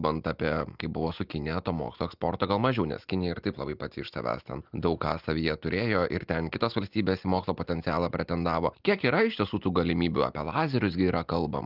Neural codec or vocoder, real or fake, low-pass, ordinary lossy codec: none; real; 5.4 kHz; Opus, 24 kbps